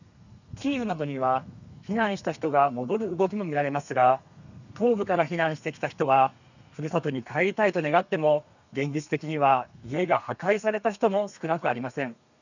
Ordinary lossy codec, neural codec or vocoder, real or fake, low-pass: none; codec, 32 kHz, 1.9 kbps, SNAC; fake; 7.2 kHz